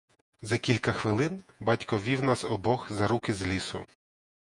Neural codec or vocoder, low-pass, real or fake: vocoder, 48 kHz, 128 mel bands, Vocos; 10.8 kHz; fake